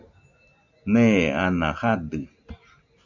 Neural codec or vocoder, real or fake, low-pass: none; real; 7.2 kHz